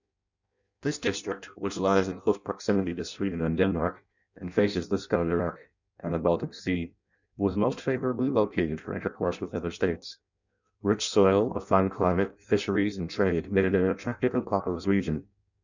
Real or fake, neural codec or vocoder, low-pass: fake; codec, 16 kHz in and 24 kHz out, 0.6 kbps, FireRedTTS-2 codec; 7.2 kHz